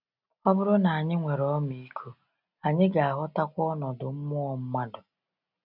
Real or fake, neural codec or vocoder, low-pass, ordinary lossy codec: real; none; 5.4 kHz; none